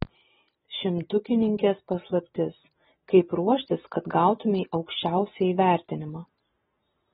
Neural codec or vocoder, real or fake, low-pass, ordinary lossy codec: none; real; 19.8 kHz; AAC, 16 kbps